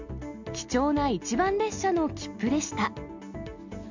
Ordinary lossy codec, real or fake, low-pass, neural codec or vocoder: Opus, 64 kbps; real; 7.2 kHz; none